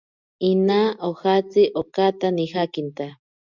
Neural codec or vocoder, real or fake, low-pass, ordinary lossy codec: none; real; 7.2 kHz; Opus, 64 kbps